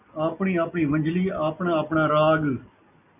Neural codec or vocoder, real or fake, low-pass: none; real; 3.6 kHz